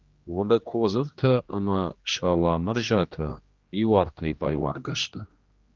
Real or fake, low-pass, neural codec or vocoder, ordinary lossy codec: fake; 7.2 kHz; codec, 16 kHz, 1 kbps, X-Codec, HuBERT features, trained on general audio; Opus, 32 kbps